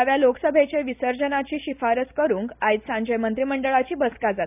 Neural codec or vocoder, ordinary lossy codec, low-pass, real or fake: none; none; 3.6 kHz; real